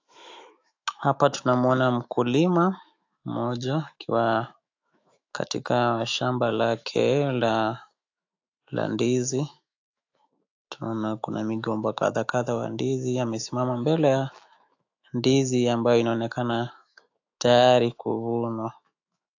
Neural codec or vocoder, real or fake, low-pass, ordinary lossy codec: autoencoder, 48 kHz, 128 numbers a frame, DAC-VAE, trained on Japanese speech; fake; 7.2 kHz; AAC, 48 kbps